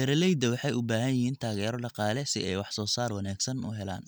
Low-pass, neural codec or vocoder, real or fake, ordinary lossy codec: none; none; real; none